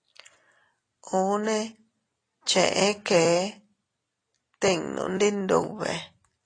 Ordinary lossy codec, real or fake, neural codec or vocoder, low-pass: AAC, 32 kbps; real; none; 9.9 kHz